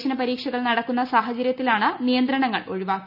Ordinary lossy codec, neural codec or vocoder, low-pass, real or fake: none; none; 5.4 kHz; real